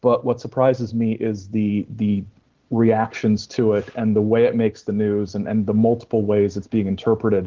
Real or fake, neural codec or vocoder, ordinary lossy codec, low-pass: real; none; Opus, 16 kbps; 7.2 kHz